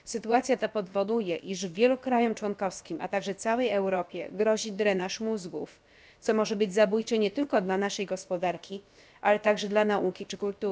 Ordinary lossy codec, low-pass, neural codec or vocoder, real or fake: none; none; codec, 16 kHz, about 1 kbps, DyCAST, with the encoder's durations; fake